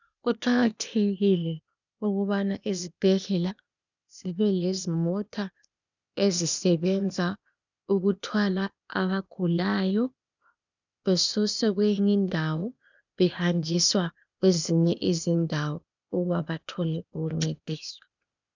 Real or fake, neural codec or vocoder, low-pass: fake; codec, 16 kHz, 0.8 kbps, ZipCodec; 7.2 kHz